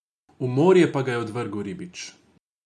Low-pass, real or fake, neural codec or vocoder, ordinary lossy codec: none; real; none; none